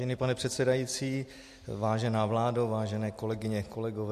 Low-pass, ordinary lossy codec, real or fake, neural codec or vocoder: 14.4 kHz; MP3, 64 kbps; real; none